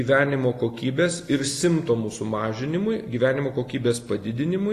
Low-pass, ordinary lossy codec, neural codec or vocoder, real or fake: 14.4 kHz; AAC, 48 kbps; none; real